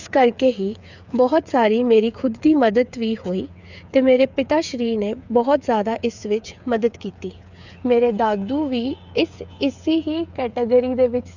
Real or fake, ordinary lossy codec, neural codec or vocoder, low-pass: fake; none; codec, 16 kHz, 8 kbps, FreqCodec, smaller model; 7.2 kHz